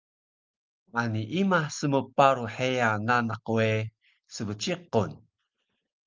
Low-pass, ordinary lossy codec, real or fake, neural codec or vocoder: 7.2 kHz; Opus, 24 kbps; real; none